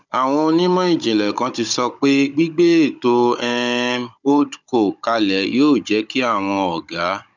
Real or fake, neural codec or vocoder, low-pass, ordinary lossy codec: fake; codec, 16 kHz, 16 kbps, FunCodec, trained on Chinese and English, 50 frames a second; 7.2 kHz; none